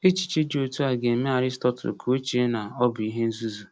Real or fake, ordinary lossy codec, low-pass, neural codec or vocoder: real; none; none; none